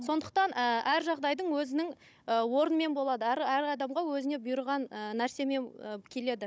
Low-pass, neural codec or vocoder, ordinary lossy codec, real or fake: none; codec, 16 kHz, 16 kbps, FunCodec, trained on Chinese and English, 50 frames a second; none; fake